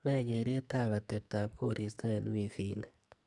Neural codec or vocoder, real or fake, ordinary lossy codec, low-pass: codec, 44.1 kHz, 2.6 kbps, SNAC; fake; none; 10.8 kHz